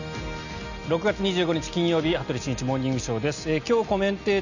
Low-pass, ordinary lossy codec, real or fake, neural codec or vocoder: 7.2 kHz; none; real; none